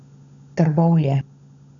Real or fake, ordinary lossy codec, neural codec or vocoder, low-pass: fake; none; codec, 16 kHz, 16 kbps, FunCodec, trained on LibriTTS, 50 frames a second; 7.2 kHz